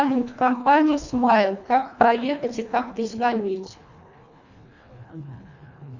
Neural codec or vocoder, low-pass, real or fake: codec, 24 kHz, 1.5 kbps, HILCodec; 7.2 kHz; fake